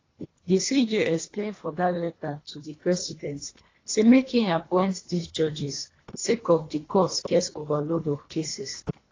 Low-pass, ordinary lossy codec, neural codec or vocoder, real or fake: 7.2 kHz; AAC, 32 kbps; codec, 24 kHz, 1.5 kbps, HILCodec; fake